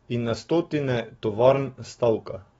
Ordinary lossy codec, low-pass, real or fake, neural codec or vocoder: AAC, 24 kbps; 19.8 kHz; fake; autoencoder, 48 kHz, 128 numbers a frame, DAC-VAE, trained on Japanese speech